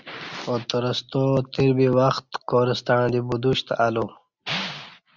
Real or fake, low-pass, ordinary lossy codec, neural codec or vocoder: real; 7.2 kHz; Opus, 64 kbps; none